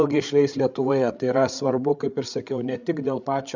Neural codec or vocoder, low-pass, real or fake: codec, 16 kHz, 8 kbps, FreqCodec, larger model; 7.2 kHz; fake